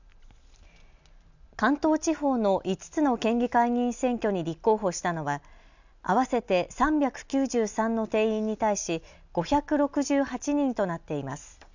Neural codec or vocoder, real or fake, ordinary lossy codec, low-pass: none; real; none; 7.2 kHz